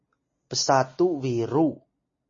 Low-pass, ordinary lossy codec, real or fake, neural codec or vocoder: 7.2 kHz; MP3, 32 kbps; real; none